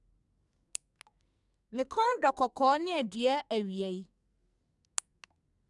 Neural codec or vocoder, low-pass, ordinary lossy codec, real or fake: codec, 44.1 kHz, 2.6 kbps, SNAC; 10.8 kHz; none; fake